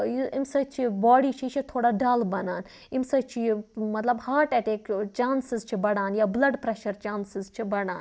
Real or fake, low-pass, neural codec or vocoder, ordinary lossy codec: real; none; none; none